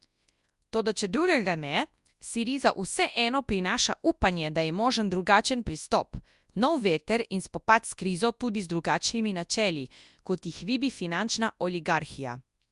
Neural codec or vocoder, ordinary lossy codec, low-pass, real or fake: codec, 24 kHz, 0.9 kbps, WavTokenizer, large speech release; Opus, 64 kbps; 10.8 kHz; fake